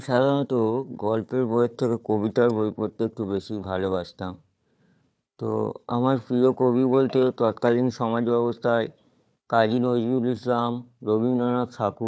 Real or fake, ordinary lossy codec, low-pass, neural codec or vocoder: fake; none; none; codec, 16 kHz, 4 kbps, FunCodec, trained on Chinese and English, 50 frames a second